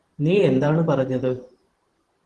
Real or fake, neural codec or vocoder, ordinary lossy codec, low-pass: fake; vocoder, 44.1 kHz, 128 mel bands every 512 samples, BigVGAN v2; Opus, 16 kbps; 10.8 kHz